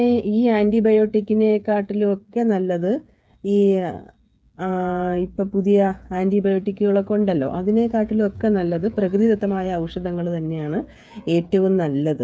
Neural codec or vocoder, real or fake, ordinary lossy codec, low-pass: codec, 16 kHz, 8 kbps, FreqCodec, smaller model; fake; none; none